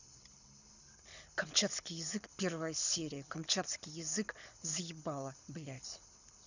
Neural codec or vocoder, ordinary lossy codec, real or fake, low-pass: codec, 24 kHz, 6 kbps, HILCodec; none; fake; 7.2 kHz